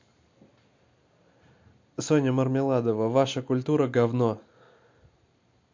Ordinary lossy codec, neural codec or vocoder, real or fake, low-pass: MP3, 48 kbps; none; real; 7.2 kHz